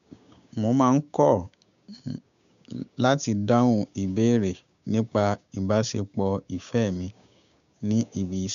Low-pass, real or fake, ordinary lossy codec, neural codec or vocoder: 7.2 kHz; fake; MP3, 96 kbps; codec, 16 kHz, 6 kbps, DAC